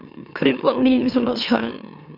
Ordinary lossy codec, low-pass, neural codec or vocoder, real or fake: none; 5.4 kHz; autoencoder, 44.1 kHz, a latent of 192 numbers a frame, MeloTTS; fake